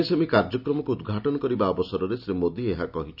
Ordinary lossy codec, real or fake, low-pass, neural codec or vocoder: none; real; 5.4 kHz; none